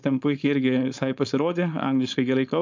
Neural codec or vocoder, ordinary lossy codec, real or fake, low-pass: codec, 16 kHz, 4.8 kbps, FACodec; MP3, 64 kbps; fake; 7.2 kHz